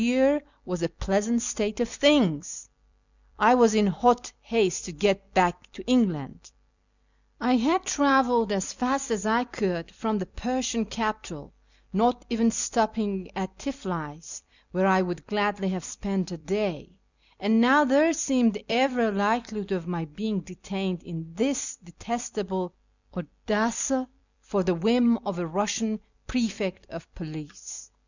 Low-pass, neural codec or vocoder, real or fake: 7.2 kHz; vocoder, 44.1 kHz, 128 mel bands every 256 samples, BigVGAN v2; fake